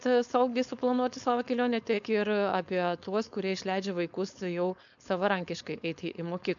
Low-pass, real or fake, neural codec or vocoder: 7.2 kHz; fake; codec, 16 kHz, 4.8 kbps, FACodec